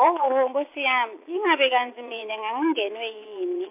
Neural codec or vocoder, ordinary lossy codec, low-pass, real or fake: vocoder, 22.05 kHz, 80 mel bands, Vocos; MP3, 32 kbps; 3.6 kHz; fake